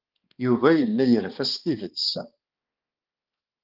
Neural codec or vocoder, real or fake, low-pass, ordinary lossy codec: codec, 16 kHz, 2 kbps, X-Codec, HuBERT features, trained on balanced general audio; fake; 5.4 kHz; Opus, 32 kbps